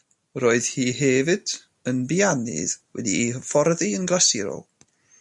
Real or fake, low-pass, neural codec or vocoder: real; 10.8 kHz; none